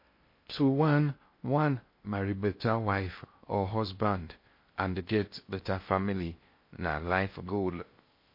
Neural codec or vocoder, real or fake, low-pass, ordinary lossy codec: codec, 16 kHz in and 24 kHz out, 0.6 kbps, FocalCodec, streaming, 2048 codes; fake; 5.4 kHz; MP3, 32 kbps